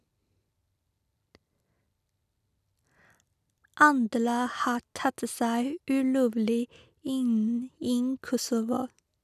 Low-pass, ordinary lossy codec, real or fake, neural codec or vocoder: 14.4 kHz; none; real; none